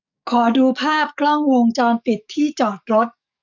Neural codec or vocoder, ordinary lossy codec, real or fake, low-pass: codec, 44.1 kHz, 7.8 kbps, DAC; none; fake; 7.2 kHz